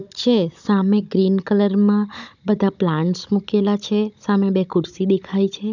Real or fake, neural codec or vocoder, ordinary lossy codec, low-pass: fake; codec, 16 kHz, 16 kbps, FunCodec, trained on Chinese and English, 50 frames a second; none; 7.2 kHz